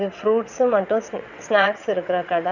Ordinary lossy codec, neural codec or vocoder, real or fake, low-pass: none; vocoder, 22.05 kHz, 80 mel bands, WaveNeXt; fake; 7.2 kHz